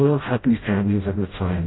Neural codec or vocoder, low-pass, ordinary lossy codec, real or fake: codec, 16 kHz, 0.5 kbps, FreqCodec, smaller model; 7.2 kHz; AAC, 16 kbps; fake